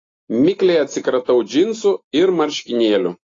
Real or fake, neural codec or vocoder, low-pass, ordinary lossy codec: real; none; 7.2 kHz; AAC, 32 kbps